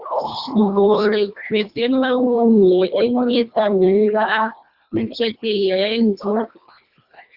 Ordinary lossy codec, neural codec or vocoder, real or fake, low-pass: Opus, 64 kbps; codec, 24 kHz, 1.5 kbps, HILCodec; fake; 5.4 kHz